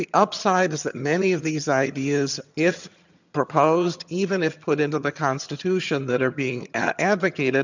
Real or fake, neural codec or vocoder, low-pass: fake; vocoder, 22.05 kHz, 80 mel bands, HiFi-GAN; 7.2 kHz